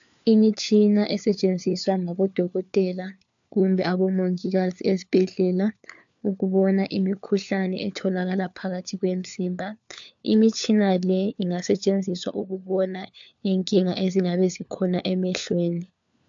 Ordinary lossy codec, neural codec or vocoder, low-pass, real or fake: AAC, 64 kbps; codec, 16 kHz, 4 kbps, FunCodec, trained on LibriTTS, 50 frames a second; 7.2 kHz; fake